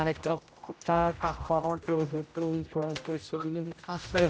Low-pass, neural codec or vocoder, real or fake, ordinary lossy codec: none; codec, 16 kHz, 0.5 kbps, X-Codec, HuBERT features, trained on general audio; fake; none